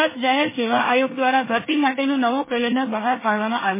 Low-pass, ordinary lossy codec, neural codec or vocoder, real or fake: 3.6 kHz; MP3, 16 kbps; codec, 24 kHz, 1 kbps, SNAC; fake